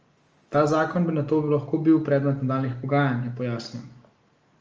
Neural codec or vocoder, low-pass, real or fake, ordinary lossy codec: none; 7.2 kHz; real; Opus, 24 kbps